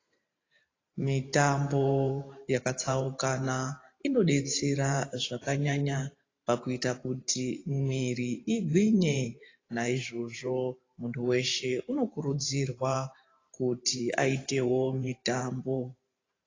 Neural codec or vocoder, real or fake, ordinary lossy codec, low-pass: vocoder, 44.1 kHz, 128 mel bands every 512 samples, BigVGAN v2; fake; AAC, 32 kbps; 7.2 kHz